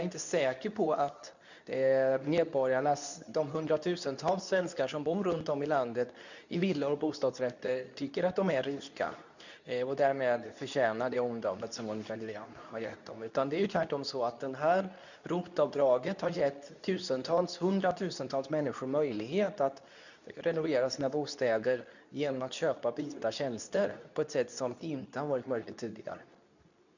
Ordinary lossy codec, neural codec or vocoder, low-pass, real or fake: none; codec, 24 kHz, 0.9 kbps, WavTokenizer, medium speech release version 2; 7.2 kHz; fake